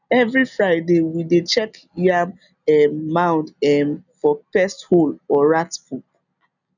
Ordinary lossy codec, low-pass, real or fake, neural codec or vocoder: none; 7.2 kHz; real; none